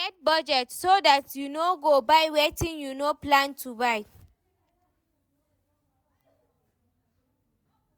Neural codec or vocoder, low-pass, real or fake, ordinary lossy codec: none; none; real; none